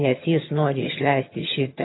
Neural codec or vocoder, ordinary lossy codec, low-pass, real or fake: vocoder, 22.05 kHz, 80 mel bands, HiFi-GAN; AAC, 16 kbps; 7.2 kHz; fake